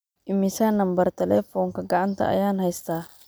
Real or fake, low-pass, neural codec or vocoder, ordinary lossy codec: real; none; none; none